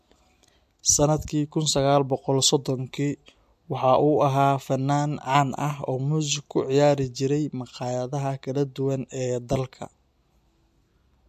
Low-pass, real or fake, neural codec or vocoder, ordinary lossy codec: 14.4 kHz; real; none; MP3, 64 kbps